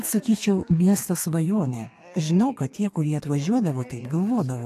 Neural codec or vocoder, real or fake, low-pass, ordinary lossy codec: codec, 32 kHz, 1.9 kbps, SNAC; fake; 14.4 kHz; AAC, 64 kbps